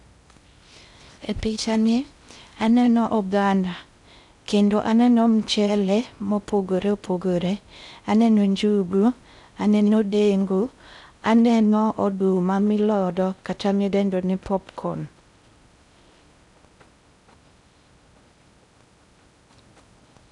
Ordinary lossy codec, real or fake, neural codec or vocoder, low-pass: none; fake; codec, 16 kHz in and 24 kHz out, 0.6 kbps, FocalCodec, streaming, 2048 codes; 10.8 kHz